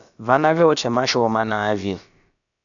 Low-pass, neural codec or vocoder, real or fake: 7.2 kHz; codec, 16 kHz, about 1 kbps, DyCAST, with the encoder's durations; fake